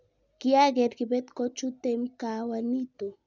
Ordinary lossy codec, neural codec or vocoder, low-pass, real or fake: none; none; 7.2 kHz; real